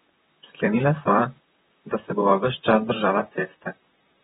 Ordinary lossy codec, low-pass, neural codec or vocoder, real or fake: AAC, 16 kbps; 19.8 kHz; vocoder, 44.1 kHz, 128 mel bands, Pupu-Vocoder; fake